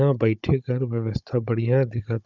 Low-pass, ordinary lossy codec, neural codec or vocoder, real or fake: 7.2 kHz; none; codec, 16 kHz, 16 kbps, FunCodec, trained on Chinese and English, 50 frames a second; fake